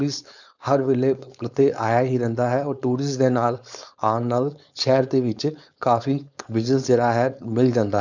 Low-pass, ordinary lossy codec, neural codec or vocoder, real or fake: 7.2 kHz; none; codec, 16 kHz, 4.8 kbps, FACodec; fake